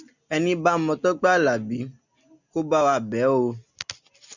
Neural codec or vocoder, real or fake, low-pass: none; real; 7.2 kHz